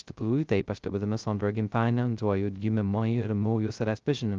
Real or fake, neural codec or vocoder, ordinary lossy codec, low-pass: fake; codec, 16 kHz, 0.2 kbps, FocalCodec; Opus, 32 kbps; 7.2 kHz